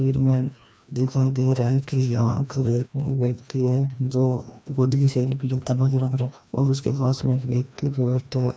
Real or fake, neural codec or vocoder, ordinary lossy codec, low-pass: fake; codec, 16 kHz, 1 kbps, FreqCodec, larger model; none; none